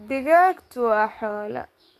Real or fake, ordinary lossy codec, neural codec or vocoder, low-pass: fake; none; codec, 44.1 kHz, 7.8 kbps, DAC; 14.4 kHz